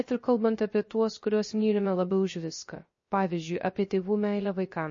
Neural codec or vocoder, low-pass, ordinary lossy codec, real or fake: codec, 16 kHz, 0.3 kbps, FocalCodec; 7.2 kHz; MP3, 32 kbps; fake